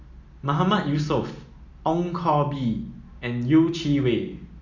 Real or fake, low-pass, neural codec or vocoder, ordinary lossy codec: real; 7.2 kHz; none; none